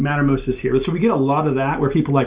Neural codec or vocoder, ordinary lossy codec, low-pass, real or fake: none; Opus, 64 kbps; 3.6 kHz; real